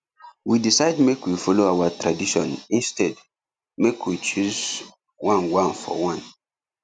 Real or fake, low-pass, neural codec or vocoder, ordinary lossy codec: real; none; none; none